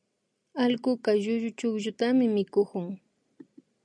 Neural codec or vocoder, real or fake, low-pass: none; real; 9.9 kHz